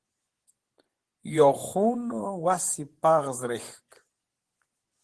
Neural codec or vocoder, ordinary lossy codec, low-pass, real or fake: vocoder, 24 kHz, 100 mel bands, Vocos; Opus, 16 kbps; 10.8 kHz; fake